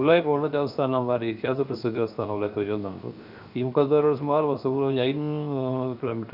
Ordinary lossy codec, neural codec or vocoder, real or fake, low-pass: none; codec, 16 kHz, 0.7 kbps, FocalCodec; fake; 5.4 kHz